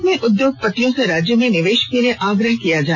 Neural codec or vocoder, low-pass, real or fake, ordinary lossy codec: none; 7.2 kHz; real; none